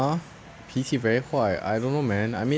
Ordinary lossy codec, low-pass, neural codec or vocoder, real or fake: none; none; none; real